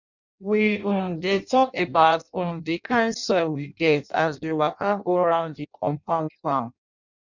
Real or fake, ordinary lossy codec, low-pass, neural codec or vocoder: fake; none; 7.2 kHz; codec, 16 kHz in and 24 kHz out, 0.6 kbps, FireRedTTS-2 codec